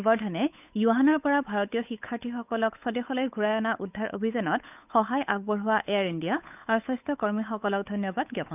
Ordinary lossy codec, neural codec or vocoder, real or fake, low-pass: none; codec, 16 kHz, 8 kbps, FunCodec, trained on Chinese and English, 25 frames a second; fake; 3.6 kHz